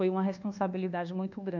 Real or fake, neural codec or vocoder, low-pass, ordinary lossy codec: fake; codec, 24 kHz, 1.2 kbps, DualCodec; 7.2 kHz; none